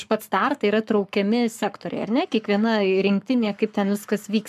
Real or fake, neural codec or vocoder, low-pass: fake; codec, 44.1 kHz, 7.8 kbps, Pupu-Codec; 14.4 kHz